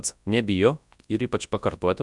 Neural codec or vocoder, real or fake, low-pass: codec, 24 kHz, 0.9 kbps, WavTokenizer, large speech release; fake; 10.8 kHz